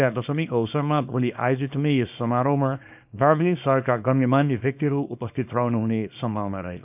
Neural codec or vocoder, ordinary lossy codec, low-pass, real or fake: codec, 24 kHz, 0.9 kbps, WavTokenizer, small release; none; 3.6 kHz; fake